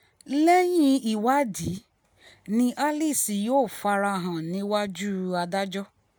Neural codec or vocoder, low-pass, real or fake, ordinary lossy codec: none; none; real; none